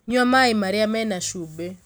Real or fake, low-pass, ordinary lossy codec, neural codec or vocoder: real; none; none; none